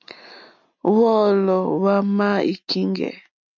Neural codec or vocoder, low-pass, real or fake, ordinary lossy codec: none; 7.2 kHz; real; MP3, 48 kbps